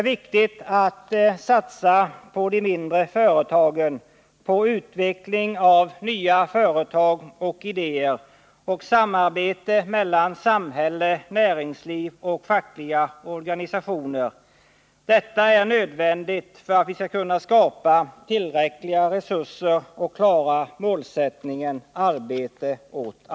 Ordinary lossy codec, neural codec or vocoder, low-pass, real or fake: none; none; none; real